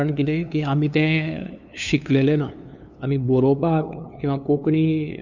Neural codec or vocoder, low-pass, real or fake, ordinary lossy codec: codec, 16 kHz, 2 kbps, FunCodec, trained on LibriTTS, 25 frames a second; 7.2 kHz; fake; none